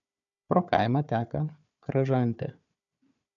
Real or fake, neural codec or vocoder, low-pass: fake; codec, 16 kHz, 4 kbps, FunCodec, trained on Chinese and English, 50 frames a second; 7.2 kHz